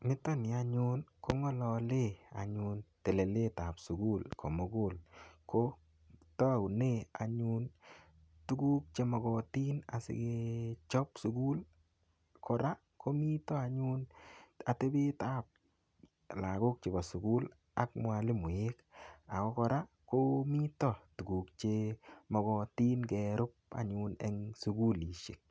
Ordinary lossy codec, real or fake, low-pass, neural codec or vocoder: none; real; none; none